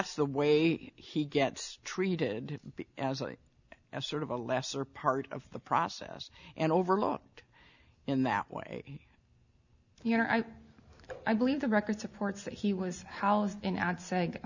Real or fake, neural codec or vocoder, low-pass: real; none; 7.2 kHz